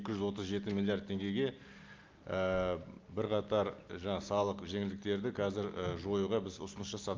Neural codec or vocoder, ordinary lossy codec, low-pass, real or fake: none; Opus, 32 kbps; 7.2 kHz; real